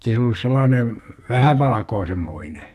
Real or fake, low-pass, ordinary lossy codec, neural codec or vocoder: fake; 14.4 kHz; none; codec, 44.1 kHz, 2.6 kbps, SNAC